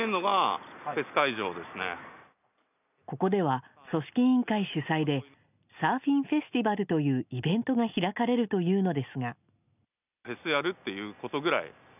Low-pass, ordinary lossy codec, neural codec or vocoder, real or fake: 3.6 kHz; none; none; real